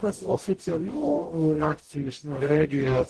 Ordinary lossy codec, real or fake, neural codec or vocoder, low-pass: Opus, 16 kbps; fake; codec, 44.1 kHz, 0.9 kbps, DAC; 10.8 kHz